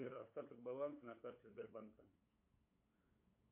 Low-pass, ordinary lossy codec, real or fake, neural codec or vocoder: 3.6 kHz; MP3, 24 kbps; fake; codec, 16 kHz, 8 kbps, FunCodec, trained on LibriTTS, 25 frames a second